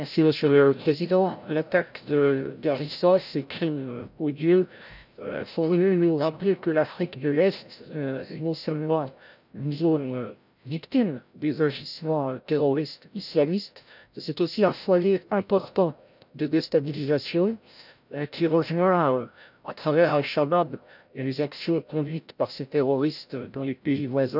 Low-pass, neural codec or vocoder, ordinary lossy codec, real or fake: 5.4 kHz; codec, 16 kHz, 0.5 kbps, FreqCodec, larger model; none; fake